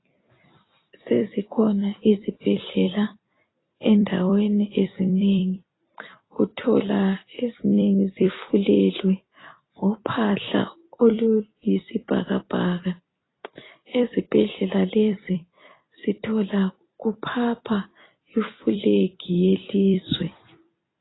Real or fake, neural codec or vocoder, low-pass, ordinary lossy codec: fake; vocoder, 44.1 kHz, 128 mel bands every 256 samples, BigVGAN v2; 7.2 kHz; AAC, 16 kbps